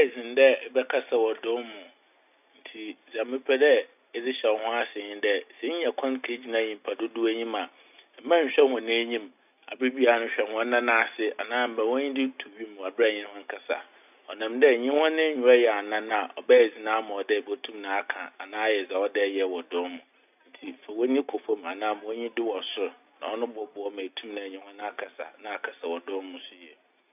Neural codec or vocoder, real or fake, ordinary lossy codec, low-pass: none; real; none; 3.6 kHz